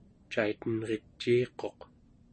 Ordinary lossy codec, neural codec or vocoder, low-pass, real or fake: MP3, 32 kbps; none; 10.8 kHz; real